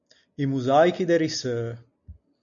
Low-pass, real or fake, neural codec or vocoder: 7.2 kHz; real; none